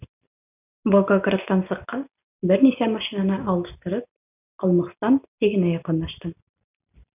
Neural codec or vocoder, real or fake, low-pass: none; real; 3.6 kHz